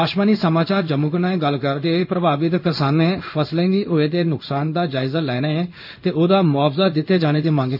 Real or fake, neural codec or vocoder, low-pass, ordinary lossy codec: fake; codec, 16 kHz in and 24 kHz out, 1 kbps, XY-Tokenizer; 5.4 kHz; none